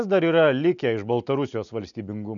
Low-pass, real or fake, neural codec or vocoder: 7.2 kHz; real; none